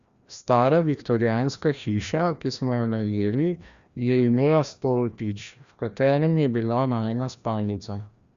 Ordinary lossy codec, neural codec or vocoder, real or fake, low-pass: Opus, 64 kbps; codec, 16 kHz, 1 kbps, FreqCodec, larger model; fake; 7.2 kHz